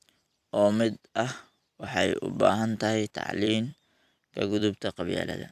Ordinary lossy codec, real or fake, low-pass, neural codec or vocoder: none; fake; 14.4 kHz; vocoder, 44.1 kHz, 128 mel bands every 256 samples, BigVGAN v2